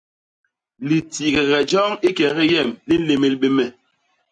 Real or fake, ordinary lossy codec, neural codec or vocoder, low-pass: real; MP3, 96 kbps; none; 9.9 kHz